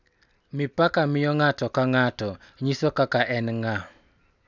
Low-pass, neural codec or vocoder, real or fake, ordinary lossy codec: 7.2 kHz; none; real; none